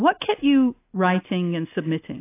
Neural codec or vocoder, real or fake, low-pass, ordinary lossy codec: none; real; 3.6 kHz; AAC, 24 kbps